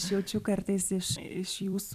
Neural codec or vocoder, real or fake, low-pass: vocoder, 44.1 kHz, 128 mel bands every 256 samples, BigVGAN v2; fake; 14.4 kHz